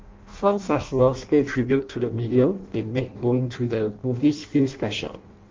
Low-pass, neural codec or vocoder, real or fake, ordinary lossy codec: 7.2 kHz; codec, 16 kHz in and 24 kHz out, 0.6 kbps, FireRedTTS-2 codec; fake; Opus, 24 kbps